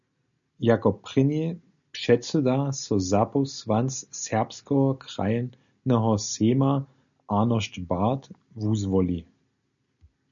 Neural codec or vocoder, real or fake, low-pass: none; real; 7.2 kHz